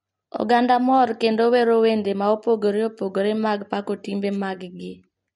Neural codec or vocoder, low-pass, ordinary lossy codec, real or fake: none; 19.8 kHz; MP3, 48 kbps; real